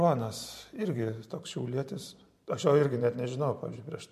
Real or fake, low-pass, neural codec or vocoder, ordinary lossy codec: fake; 14.4 kHz; vocoder, 44.1 kHz, 128 mel bands every 512 samples, BigVGAN v2; MP3, 64 kbps